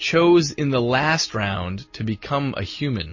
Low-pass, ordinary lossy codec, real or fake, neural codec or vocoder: 7.2 kHz; MP3, 32 kbps; real; none